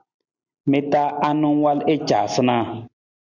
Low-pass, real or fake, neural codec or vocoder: 7.2 kHz; real; none